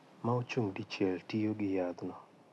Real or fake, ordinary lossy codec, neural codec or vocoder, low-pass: real; none; none; none